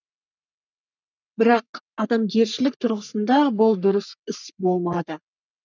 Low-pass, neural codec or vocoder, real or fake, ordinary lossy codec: 7.2 kHz; codec, 44.1 kHz, 3.4 kbps, Pupu-Codec; fake; none